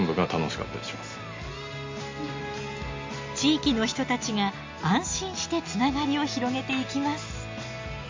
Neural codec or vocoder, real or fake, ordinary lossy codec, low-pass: none; real; MP3, 48 kbps; 7.2 kHz